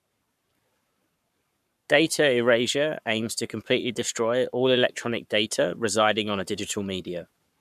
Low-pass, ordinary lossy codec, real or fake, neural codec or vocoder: 14.4 kHz; none; fake; codec, 44.1 kHz, 7.8 kbps, Pupu-Codec